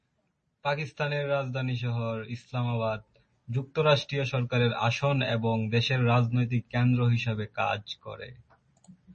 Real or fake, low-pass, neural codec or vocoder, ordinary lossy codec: real; 10.8 kHz; none; MP3, 32 kbps